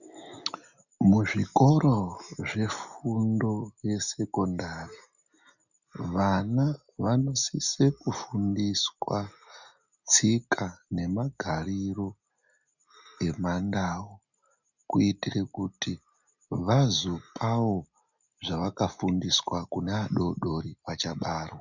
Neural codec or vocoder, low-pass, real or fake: none; 7.2 kHz; real